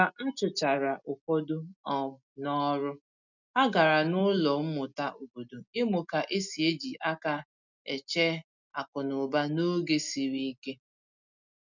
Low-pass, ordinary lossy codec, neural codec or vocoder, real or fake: 7.2 kHz; none; none; real